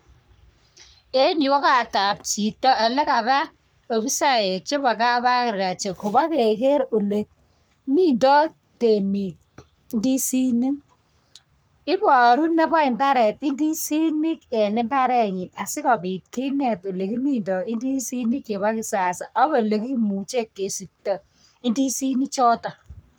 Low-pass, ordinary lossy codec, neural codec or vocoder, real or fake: none; none; codec, 44.1 kHz, 3.4 kbps, Pupu-Codec; fake